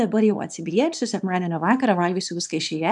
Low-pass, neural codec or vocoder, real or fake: 10.8 kHz; codec, 24 kHz, 0.9 kbps, WavTokenizer, small release; fake